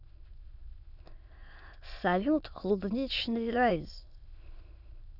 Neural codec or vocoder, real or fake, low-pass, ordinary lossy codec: autoencoder, 22.05 kHz, a latent of 192 numbers a frame, VITS, trained on many speakers; fake; 5.4 kHz; none